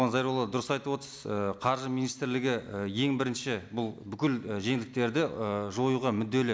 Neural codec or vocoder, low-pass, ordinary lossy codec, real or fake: none; none; none; real